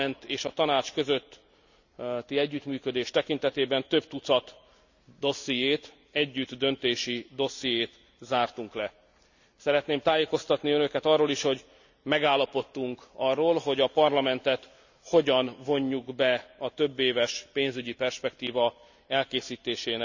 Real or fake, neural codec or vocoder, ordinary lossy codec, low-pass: real; none; none; 7.2 kHz